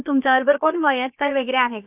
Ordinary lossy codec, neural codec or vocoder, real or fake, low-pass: none; codec, 16 kHz, about 1 kbps, DyCAST, with the encoder's durations; fake; 3.6 kHz